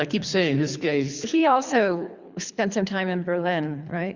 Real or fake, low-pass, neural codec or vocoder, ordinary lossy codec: fake; 7.2 kHz; codec, 24 kHz, 3 kbps, HILCodec; Opus, 64 kbps